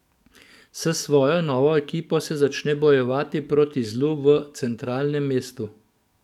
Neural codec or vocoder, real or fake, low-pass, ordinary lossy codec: codec, 44.1 kHz, 7.8 kbps, DAC; fake; 19.8 kHz; none